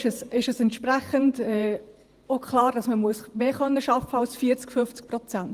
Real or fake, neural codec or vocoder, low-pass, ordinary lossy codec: fake; vocoder, 48 kHz, 128 mel bands, Vocos; 14.4 kHz; Opus, 24 kbps